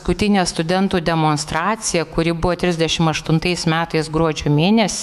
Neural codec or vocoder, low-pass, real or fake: autoencoder, 48 kHz, 128 numbers a frame, DAC-VAE, trained on Japanese speech; 14.4 kHz; fake